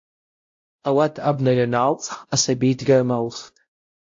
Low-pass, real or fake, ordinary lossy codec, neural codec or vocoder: 7.2 kHz; fake; AAC, 48 kbps; codec, 16 kHz, 0.5 kbps, X-Codec, WavLM features, trained on Multilingual LibriSpeech